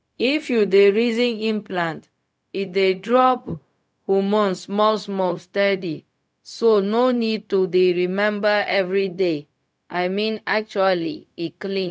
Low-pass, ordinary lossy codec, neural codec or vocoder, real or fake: none; none; codec, 16 kHz, 0.4 kbps, LongCat-Audio-Codec; fake